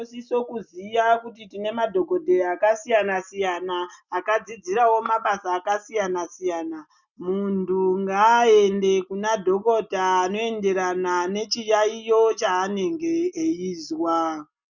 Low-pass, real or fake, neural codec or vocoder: 7.2 kHz; real; none